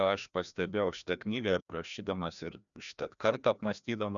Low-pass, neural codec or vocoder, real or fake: 7.2 kHz; codec, 16 kHz, 1 kbps, FreqCodec, larger model; fake